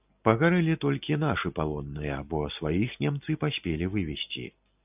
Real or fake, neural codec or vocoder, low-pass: real; none; 3.6 kHz